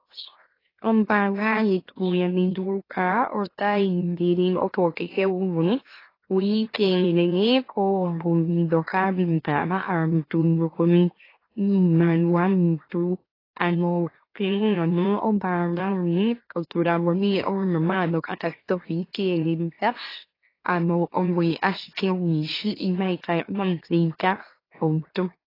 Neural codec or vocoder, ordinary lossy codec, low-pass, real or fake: autoencoder, 44.1 kHz, a latent of 192 numbers a frame, MeloTTS; AAC, 24 kbps; 5.4 kHz; fake